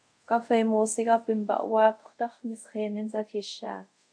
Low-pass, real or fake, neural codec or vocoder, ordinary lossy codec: 9.9 kHz; fake; codec, 24 kHz, 0.5 kbps, DualCodec; AAC, 64 kbps